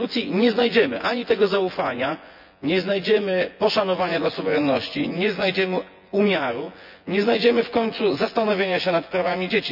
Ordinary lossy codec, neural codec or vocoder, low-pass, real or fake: MP3, 48 kbps; vocoder, 24 kHz, 100 mel bands, Vocos; 5.4 kHz; fake